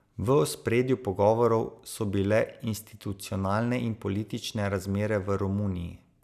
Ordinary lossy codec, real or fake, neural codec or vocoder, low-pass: none; real; none; 14.4 kHz